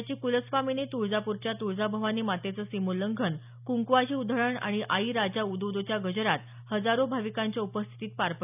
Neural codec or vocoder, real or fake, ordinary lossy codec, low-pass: none; real; none; 3.6 kHz